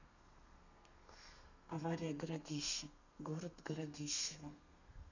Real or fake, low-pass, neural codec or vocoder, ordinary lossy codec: fake; 7.2 kHz; codec, 32 kHz, 1.9 kbps, SNAC; none